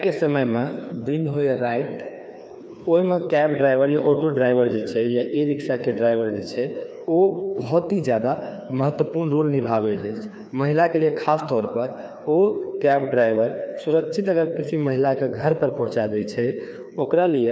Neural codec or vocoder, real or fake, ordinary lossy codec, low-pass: codec, 16 kHz, 2 kbps, FreqCodec, larger model; fake; none; none